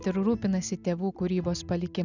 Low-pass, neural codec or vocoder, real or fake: 7.2 kHz; none; real